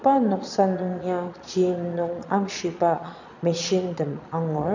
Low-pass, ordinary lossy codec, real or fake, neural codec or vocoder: 7.2 kHz; none; fake; vocoder, 22.05 kHz, 80 mel bands, Vocos